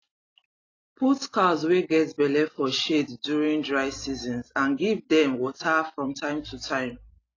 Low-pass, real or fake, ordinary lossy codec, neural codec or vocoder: 7.2 kHz; real; AAC, 32 kbps; none